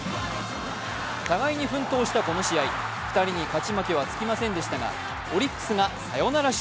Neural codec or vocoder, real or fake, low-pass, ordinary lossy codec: none; real; none; none